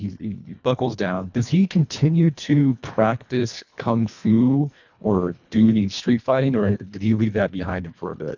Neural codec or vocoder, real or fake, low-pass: codec, 24 kHz, 1.5 kbps, HILCodec; fake; 7.2 kHz